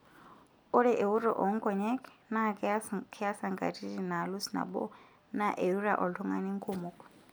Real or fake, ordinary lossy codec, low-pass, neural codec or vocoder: real; none; none; none